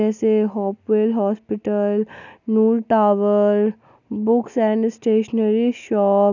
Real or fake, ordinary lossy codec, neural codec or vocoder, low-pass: real; none; none; 7.2 kHz